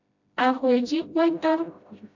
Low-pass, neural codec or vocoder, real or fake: 7.2 kHz; codec, 16 kHz, 0.5 kbps, FreqCodec, smaller model; fake